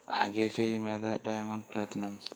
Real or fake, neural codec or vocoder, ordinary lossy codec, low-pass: fake; codec, 44.1 kHz, 2.6 kbps, SNAC; none; none